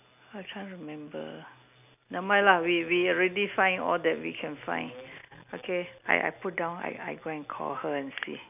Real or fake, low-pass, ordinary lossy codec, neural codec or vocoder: real; 3.6 kHz; none; none